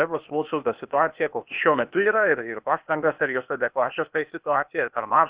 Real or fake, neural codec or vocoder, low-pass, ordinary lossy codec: fake; codec, 16 kHz, 0.8 kbps, ZipCodec; 3.6 kHz; Opus, 64 kbps